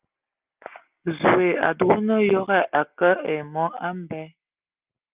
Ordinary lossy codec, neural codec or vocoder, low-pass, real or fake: Opus, 24 kbps; none; 3.6 kHz; real